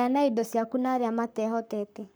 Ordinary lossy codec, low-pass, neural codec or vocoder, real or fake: none; none; codec, 44.1 kHz, 7.8 kbps, Pupu-Codec; fake